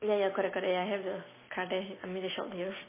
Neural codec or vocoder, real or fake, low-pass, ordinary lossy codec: none; real; 3.6 kHz; MP3, 16 kbps